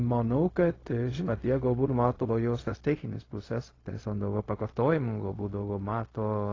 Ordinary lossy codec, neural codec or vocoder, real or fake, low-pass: AAC, 32 kbps; codec, 16 kHz, 0.4 kbps, LongCat-Audio-Codec; fake; 7.2 kHz